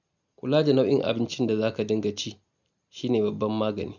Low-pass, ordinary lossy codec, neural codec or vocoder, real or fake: 7.2 kHz; none; none; real